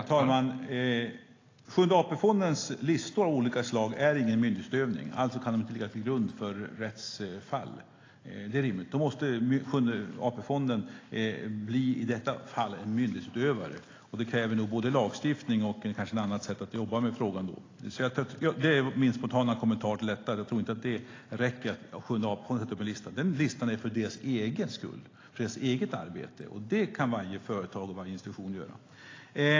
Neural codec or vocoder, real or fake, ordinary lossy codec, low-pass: none; real; AAC, 32 kbps; 7.2 kHz